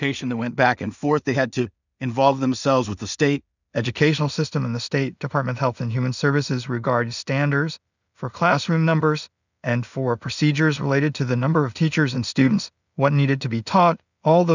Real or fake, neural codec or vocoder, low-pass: fake; codec, 16 kHz in and 24 kHz out, 0.4 kbps, LongCat-Audio-Codec, two codebook decoder; 7.2 kHz